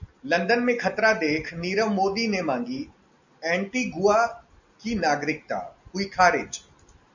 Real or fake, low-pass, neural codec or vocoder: real; 7.2 kHz; none